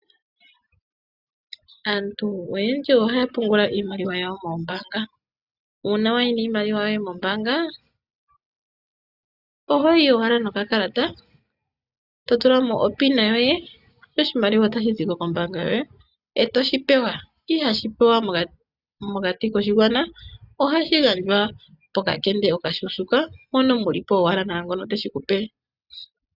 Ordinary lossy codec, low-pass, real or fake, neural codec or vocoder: Opus, 64 kbps; 5.4 kHz; real; none